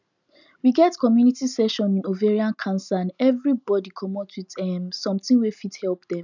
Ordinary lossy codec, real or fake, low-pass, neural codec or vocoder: none; real; 7.2 kHz; none